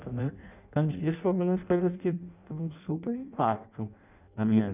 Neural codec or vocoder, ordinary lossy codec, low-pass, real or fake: codec, 16 kHz in and 24 kHz out, 0.6 kbps, FireRedTTS-2 codec; none; 3.6 kHz; fake